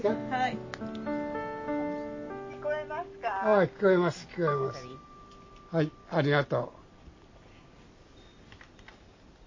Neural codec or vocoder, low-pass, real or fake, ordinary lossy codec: none; 7.2 kHz; real; MP3, 32 kbps